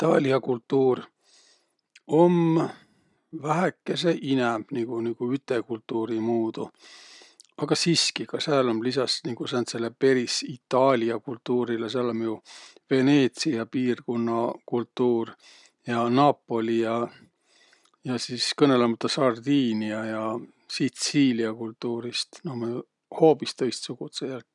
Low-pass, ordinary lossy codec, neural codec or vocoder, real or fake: 10.8 kHz; none; none; real